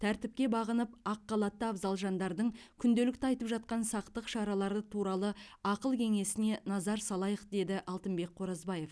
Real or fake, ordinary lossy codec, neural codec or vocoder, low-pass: real; none; none; none